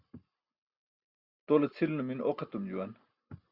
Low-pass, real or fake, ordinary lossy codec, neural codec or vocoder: 5.4 kHz; real; Opus, 64 kbps; none